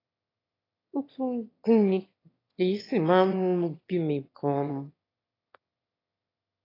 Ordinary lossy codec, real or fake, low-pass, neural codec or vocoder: AAC, 24 kbps; fake; 5.4 kHz; autoencoder, 22.05 kHz, a latent of 192 numbers a frame, VITS, trained on one speaker